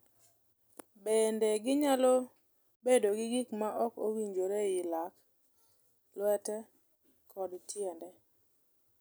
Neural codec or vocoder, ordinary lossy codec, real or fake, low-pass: none; none; real; none